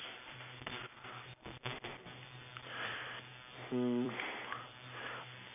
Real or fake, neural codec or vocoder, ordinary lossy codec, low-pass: fake; vocoder, 44.1 kHz, 128 mel bands every 256 samples, BigVGAN v2; none; 3.6 kHz